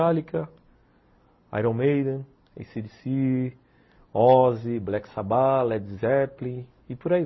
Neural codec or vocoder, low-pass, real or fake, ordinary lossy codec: none; 7.2 kHz; real; MP3, 24 kbps